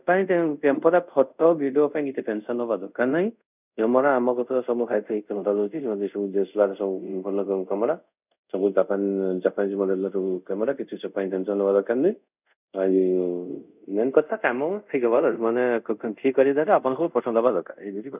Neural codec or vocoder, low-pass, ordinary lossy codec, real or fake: codec, 24 kHz, 0.5 kbps, DualCodec; 3.6 kHz; none; fake